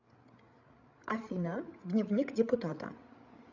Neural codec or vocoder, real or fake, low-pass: codec, 16 kHz, 8 kbps, FreqCodec, larger model; fake; 7.2 kHz